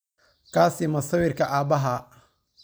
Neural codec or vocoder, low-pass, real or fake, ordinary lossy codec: none; none; real; none